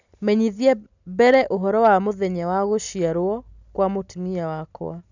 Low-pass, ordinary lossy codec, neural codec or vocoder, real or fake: 7.2 kHz; none; none; real